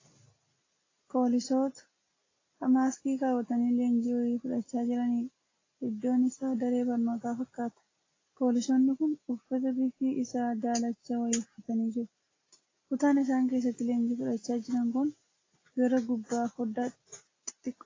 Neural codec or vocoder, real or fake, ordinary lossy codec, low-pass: none; real; AAC, 32 kbps; 7.2 kHz